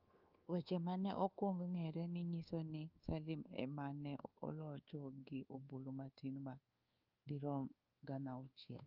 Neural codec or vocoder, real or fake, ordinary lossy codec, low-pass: codec, 24 kHz, 1.2 kbps, DualCodec; fake; Opus, 24 kbps; 5.4 kHz